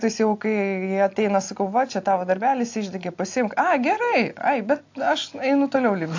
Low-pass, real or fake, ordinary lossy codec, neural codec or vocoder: 7.2 kHz; real; AAC, 48 kbps; none